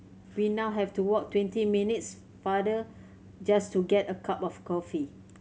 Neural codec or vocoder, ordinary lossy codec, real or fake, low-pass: none; none; real; none